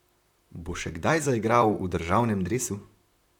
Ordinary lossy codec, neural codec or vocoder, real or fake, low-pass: none; vocoder, 44.1 kHz, 128 mel bands, Pupu-Vocoder; fake; 19.8 kHz